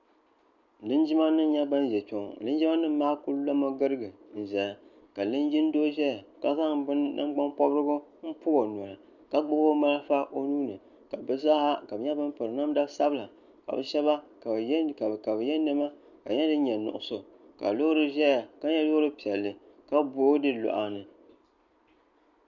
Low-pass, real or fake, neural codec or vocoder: 7.2 kHz; real; none